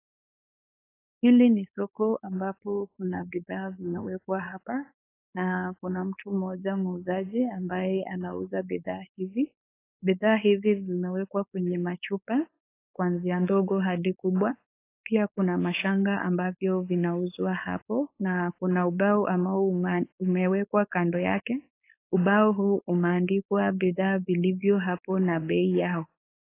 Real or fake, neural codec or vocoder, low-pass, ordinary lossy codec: fake; codec, 16 kHz, 4.8 kbps, FACodec; 3.6 kHz; AAC, 24 kbps